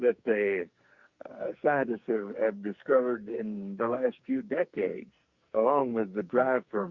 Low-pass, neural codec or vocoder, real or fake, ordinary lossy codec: 7.2 kHz; codec, 44.1 kHz, 2.6 kbps, SNAC; fake; Opus, 64 kbps